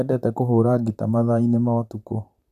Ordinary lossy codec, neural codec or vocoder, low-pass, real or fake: none; vocoder, 44.1 kHz, 128 mel bands, Pupu-Vocoder; 14.4 kHz; fake